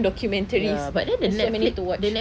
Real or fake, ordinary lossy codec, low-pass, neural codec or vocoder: real; none; none; none